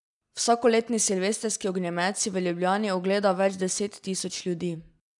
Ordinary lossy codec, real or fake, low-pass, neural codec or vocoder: none; real; 10.8 kHz; none